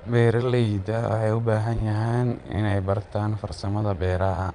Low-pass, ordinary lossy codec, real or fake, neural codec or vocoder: 9.9 kHz; none; fake; vocoder, 22.05 kHz, 80 mel bands, Vocos